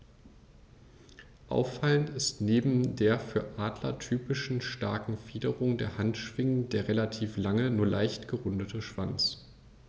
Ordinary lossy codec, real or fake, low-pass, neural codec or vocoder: none; real; none; none